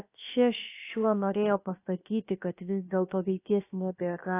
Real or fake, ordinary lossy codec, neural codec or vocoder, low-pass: fake; AAC, 24 kbps; codec, 16 kHz, about 1 kbps, DyCAST, with the encoder's durations; 3.6 kHz